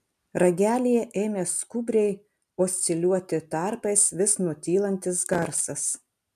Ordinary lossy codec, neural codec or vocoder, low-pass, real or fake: MP3, 96 kbps; none; 14.4 kHz; real